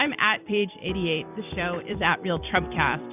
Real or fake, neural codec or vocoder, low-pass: fake; vocoder, 44.1 kHz, 128 mel bands every 256 samples, BigVGAN v2; 3.6 kHz